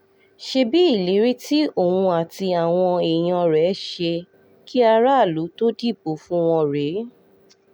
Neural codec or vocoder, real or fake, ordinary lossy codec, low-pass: none; real; none; 19.8 kHz